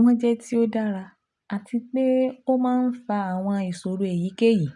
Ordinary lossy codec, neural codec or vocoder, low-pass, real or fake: none; none; 10.8 kHz; real